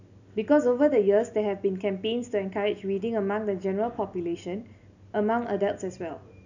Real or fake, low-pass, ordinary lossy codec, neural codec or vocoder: real; 7.2 kHz; none; none